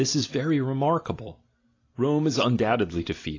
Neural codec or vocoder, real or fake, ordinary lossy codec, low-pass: none; real; AAC, 32 kbps; 7.2 kHz